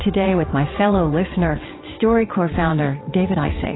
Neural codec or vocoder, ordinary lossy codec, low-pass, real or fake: vocoder, 22.05 kHz, 80 mel bands, WaveNeXt; AAC, 16 kbps; 7.2 kHz; fake